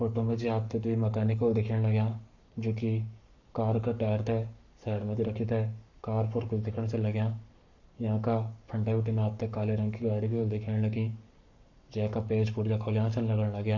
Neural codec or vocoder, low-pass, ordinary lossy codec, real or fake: codec, 44.1 kHz, 7.8 kbps, Pupu-Codec; 7.2 kHz; none; fake